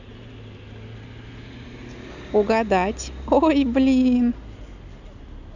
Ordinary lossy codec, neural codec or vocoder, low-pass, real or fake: none; none; 7.2 kHz; real